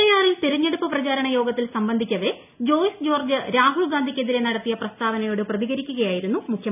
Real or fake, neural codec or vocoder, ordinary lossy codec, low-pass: real; none; none; 3.6 kHz